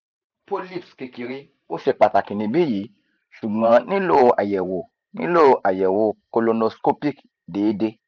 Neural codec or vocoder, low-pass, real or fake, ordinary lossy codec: vocoder, 44.1 kHz, 128 mel bands every 512 samples, BigVGAN v2; 7.2 kHz; fake; none